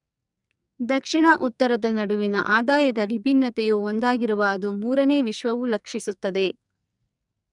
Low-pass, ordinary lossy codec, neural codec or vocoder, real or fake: 10.8 kHz; none; codec, 44.1 kHz, 2.6 kbps, SNAC; fake